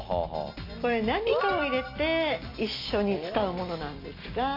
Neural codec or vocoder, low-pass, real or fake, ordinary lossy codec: none; 5.4 kHz; real; AAC, 32 kbps